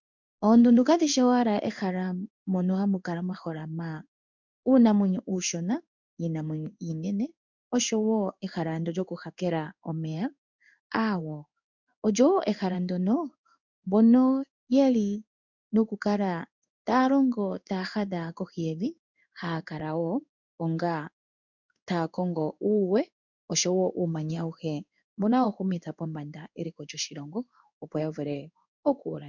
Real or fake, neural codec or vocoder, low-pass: fake; codec, 16 kHz in and 24 kHz out, 1 kbps, XY-Tokenizer; 7.2 kHz